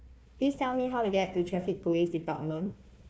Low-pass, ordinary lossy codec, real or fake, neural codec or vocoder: none; none; fake; codec, 16 kHz, 1 kbps, FunCodec, trained on Chinese and English, 50 frames a second